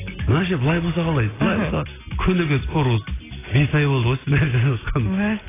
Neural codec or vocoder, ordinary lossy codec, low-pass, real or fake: none; AAC, 16 kbps; 3.6 kHz; real